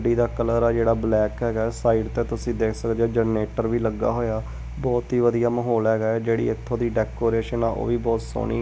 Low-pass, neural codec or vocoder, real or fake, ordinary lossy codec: none; none; real; none